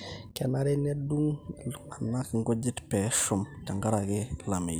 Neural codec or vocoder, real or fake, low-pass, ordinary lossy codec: none; real; none; none